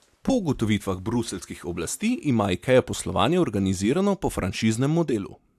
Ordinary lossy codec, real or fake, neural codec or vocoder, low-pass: AAC, 96 kbps; real; none; 14.4 kHz